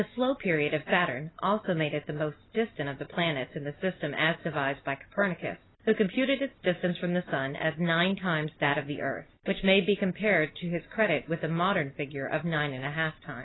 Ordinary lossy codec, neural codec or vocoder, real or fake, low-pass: AAC, 16 kbps; none; real; 7.2 kHz